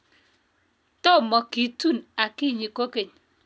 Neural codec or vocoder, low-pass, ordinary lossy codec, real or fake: none; none; none; real